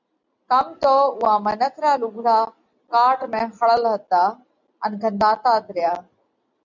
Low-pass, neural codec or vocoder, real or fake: 7.2 kHz; none; real